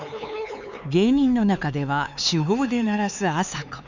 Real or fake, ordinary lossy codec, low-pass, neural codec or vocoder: fake; none; 7.2 kHz; codec, 16 kHz, 4 kbps, X-Codec, HuBERT features, trained on LibriSpeech